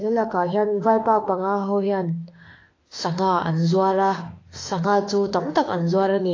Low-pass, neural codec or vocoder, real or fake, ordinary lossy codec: 7.2 kHz; autoencoder, 48 kHz, 32 numbers a frame, DAC-VAE, trained on Japanese speech; fake; AAC, 32 kbps